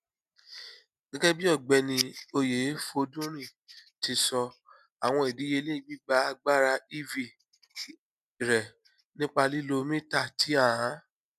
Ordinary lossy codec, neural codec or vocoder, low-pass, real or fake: none; none; 14.4 kHz; real